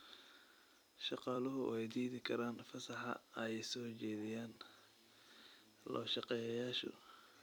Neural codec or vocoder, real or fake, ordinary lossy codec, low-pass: vocoder, 44.1 kHz, 128 mel bands every 256 samples, BigVGAN v2; fake; none; none